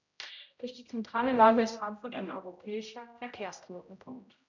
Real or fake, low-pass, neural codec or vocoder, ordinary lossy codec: fake; 7.2 kHz; codec, 16 kHz, 0.5 kbps, X-Codec, HuBERT features, trained on general audio; none